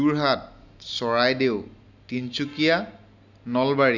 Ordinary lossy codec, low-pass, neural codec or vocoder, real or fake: none; 7.2 kHz; none; real